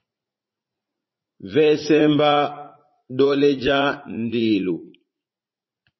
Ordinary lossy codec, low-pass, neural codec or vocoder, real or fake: MP3, 24 kbps; 7.2 kHz; vocoder, 44.1 kHz, 80 mel bands, Vocos; fake